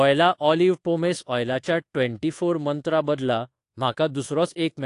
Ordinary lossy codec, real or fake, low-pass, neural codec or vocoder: AAC, 48 kbps; fake; 10.8 kHz; codec, 24 kHz, 1.2 kbps, DualCodec